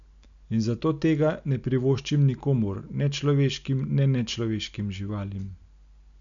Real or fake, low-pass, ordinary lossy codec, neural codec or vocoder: real; 7.2 kHz; none; none